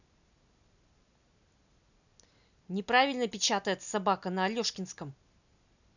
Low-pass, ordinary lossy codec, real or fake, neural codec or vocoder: 7.2 kHz; none; real; none